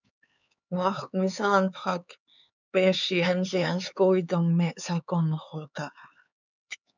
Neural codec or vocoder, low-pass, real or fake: codec, 16 kHz, 4 kbps, X-Codec, HuBERT features, trained on LibriSpeech; 7.2 kHz; fake